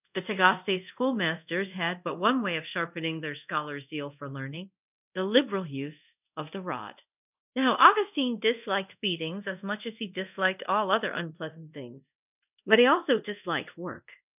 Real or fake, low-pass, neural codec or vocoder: fake; 3.6 kHz; codec, 24 kHz, 0.5 kbps, DualCodec